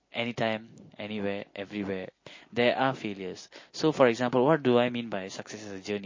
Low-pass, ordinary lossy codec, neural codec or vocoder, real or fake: 7.2 kHz; MP3, 32 kbps; none; real